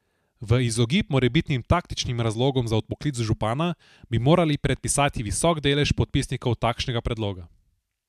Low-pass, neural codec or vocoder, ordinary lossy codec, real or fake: 14.4 kHz; none; MP3, 96 kbps; real